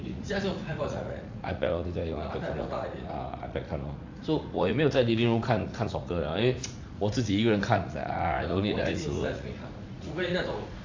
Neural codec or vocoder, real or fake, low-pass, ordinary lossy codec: vocoder, 44.1 kHz, 80 mel bands, Vocos; fake; 7.2 kHz; AAC, 48 kbps